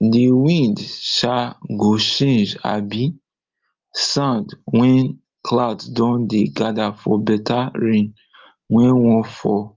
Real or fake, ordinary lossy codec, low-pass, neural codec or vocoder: real; Opus, 32 kbps; 7.2 kHz; none